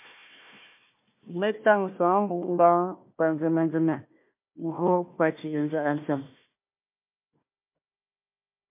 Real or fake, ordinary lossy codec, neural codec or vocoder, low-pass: fake; MP3, 32 kbps; codec, 16 kHz, 1 kbps, FunCodec, trained on Chinese and English, 50 frames a second; 3.6 kHz